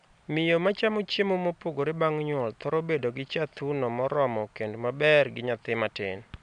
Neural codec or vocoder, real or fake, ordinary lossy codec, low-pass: none; real; none; 9.9 kHz